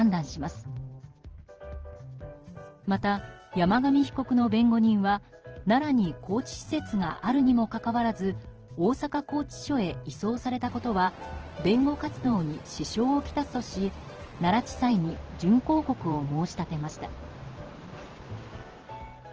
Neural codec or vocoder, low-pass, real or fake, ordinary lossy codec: vocoder, 44.1 kHz, 128 mel bands every 512 samples, BigVGAN v2; 7.2 kHz; fake; Opus, 16 kbps